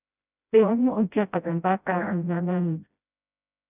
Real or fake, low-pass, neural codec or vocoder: fake; 3.6 kHz; codec, 16 kHz, 0.5 kbps, FreqCodec, smaller model